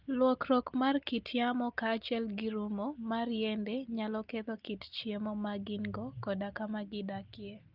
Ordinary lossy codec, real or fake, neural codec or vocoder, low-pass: Opus, 32 kbps; real; none; 5.4 kHz